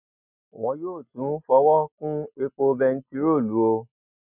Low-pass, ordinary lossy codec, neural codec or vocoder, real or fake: 3.6 kHz; none; none; real